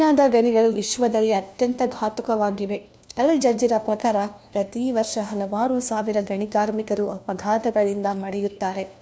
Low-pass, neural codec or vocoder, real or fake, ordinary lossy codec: none; codec, 16 kHz, 1 kbps, FunCodec, trained on LibriTTS, 50 frames a second; fake; none